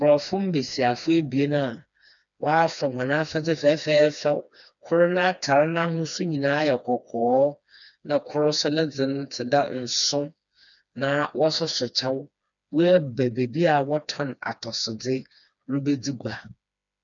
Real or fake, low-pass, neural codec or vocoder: fake; 7.2 kHz; codec, 16 kHz, 2 kbps, FreqCodec, smaller model